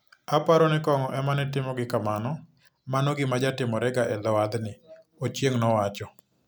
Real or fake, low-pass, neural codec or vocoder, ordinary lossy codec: real; none; none; none